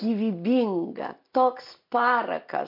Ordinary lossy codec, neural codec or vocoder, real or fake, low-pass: MP3, 48 kbps; none; real; 5.4 kHz